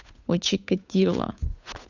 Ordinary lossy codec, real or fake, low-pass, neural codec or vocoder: none; fake; 7.2 kHz; codec, 16 kHz, 8 kbps, FunCodec, trained on Chinese and English, 25 frames a second